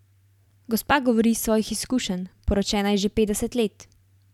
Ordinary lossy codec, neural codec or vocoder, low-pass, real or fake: none; vocoder, 44.1 kHz, 128 mel bands every 512 samples, BigVGAN v2; 19.8 kHz; fake